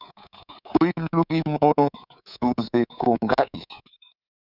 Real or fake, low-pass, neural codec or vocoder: fake; 5.4 kHz; codec, 24 kHz, 3.1 kbps, DualCodec